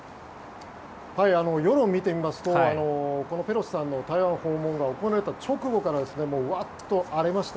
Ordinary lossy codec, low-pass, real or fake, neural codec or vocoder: none; none; real; none